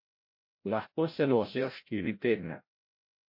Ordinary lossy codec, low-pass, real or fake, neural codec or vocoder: MP3, 32 kbps; 5.4 kHz; fake; codec, 16 kHz, 0.5 kbps, FreqCodec, larger model